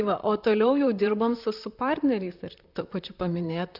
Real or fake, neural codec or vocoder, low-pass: fake; vocoder, 44.1 kHz, 128 mel bands, Pupu-Vocoder; 5.4 kHz